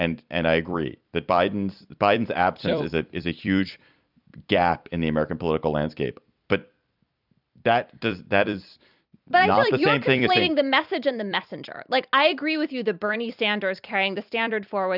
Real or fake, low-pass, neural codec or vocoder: fake; 5.4 kHz; vocoder, 44.1 kHz, 128 mel bands every 256 samples, BigVGAN v2